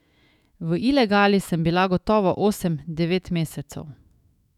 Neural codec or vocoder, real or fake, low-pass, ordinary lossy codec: autoencoder, 48 kHz, 128 numbers a frame, DAC-VAE, trained on Japanese speech; fake; 19.8 kHz; none